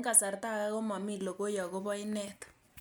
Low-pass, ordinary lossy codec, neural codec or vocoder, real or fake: none; none; none; real